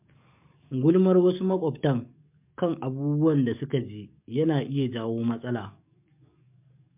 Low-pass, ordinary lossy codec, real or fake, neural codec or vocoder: 3.6 kHz; MP3, 32 kbps; fake; codec, 44.1 kHz, 7.8 kbps, DAC